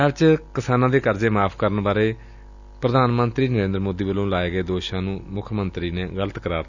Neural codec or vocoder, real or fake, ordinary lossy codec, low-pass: none; real; none; 7.2 kHz